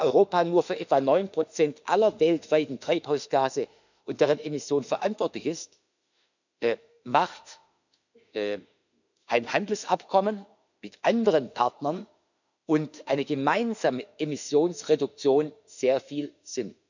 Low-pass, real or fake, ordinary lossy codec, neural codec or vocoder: 7.2 kHz; fake; none; autoencoder, 48 kHz, 32 numbers a frame, DAC-VAE, trained on Japanese speech